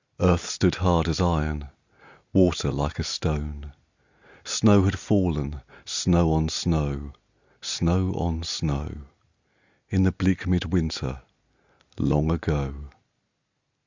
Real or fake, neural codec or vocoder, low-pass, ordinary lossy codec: real; none; 7.2 kHz; Opus, 64 kbps